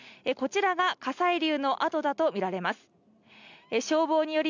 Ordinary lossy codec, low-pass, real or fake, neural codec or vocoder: none; 7.2 kHz; real; none